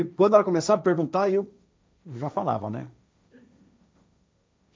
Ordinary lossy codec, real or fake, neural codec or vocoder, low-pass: none; fake; codec, 16 kHz, 1.1 kbps, Voila-Tokenizer; none